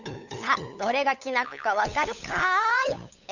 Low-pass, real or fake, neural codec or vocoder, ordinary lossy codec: 7.2 kHz; fake; codec, 16 kHz, 8 kbps, FunCodec, trained on LibriTTS, 25 frames a second; none